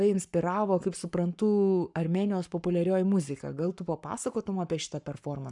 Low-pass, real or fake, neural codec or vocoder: 10.8 kHz; fake; codec, 44.1 kHz, 7.8 kbps, Pupu-Codec